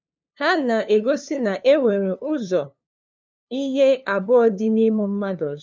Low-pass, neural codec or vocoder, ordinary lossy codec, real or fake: none; codec, 16 kHz, 2 kbps, FunCodec, trained on LibriTTS, 25 frames a second; none; fake